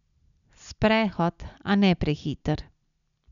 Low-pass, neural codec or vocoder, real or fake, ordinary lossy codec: 7.2 kHz; none; real; none